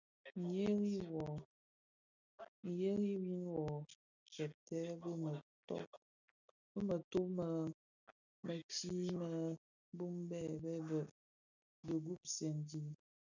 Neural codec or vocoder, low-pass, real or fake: none; 7.2 kHz; real